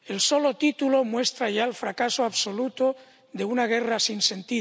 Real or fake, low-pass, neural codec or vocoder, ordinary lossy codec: real; none; none; none